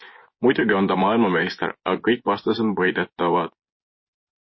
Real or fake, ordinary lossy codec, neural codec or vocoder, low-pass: fake; MP3, 24 kbps; vocoder, 24 kHz, 100 mel bands, Vocos; 7.2 kHz